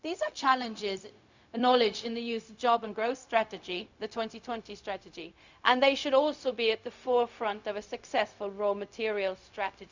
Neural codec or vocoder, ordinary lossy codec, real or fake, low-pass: codec, 16 kHz, 0.4 kbps, LongCat-Audio-Codec; Opus, 64 kbps; fake; 7.2 kHz